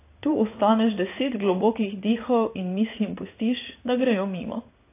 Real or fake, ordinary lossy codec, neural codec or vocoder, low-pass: fake; AAC, 24 kbps; codec, 16 kHz in and 24 kHz out, 1 kbps, XY-Tokenizer; 3.6 kHz